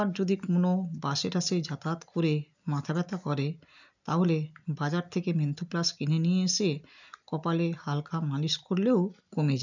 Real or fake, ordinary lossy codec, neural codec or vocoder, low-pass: real; none; none; 7.2 kHz